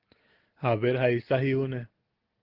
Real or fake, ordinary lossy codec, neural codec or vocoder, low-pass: real; Opus, 16 kbps; none; 5.4 kHz